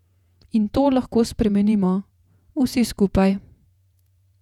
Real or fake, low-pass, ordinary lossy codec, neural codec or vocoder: fake; 19.8 kHz; none; vocoder, 48 kHz, 128 mel bands, Vocos